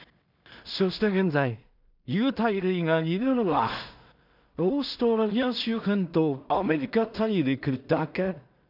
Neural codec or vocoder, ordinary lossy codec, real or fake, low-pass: codec, 16 kHz in and 24 kHz out, 0.4 kbps, LongCat-Audio-Codec, two codebook decoder; none; fake; 5.4 kHz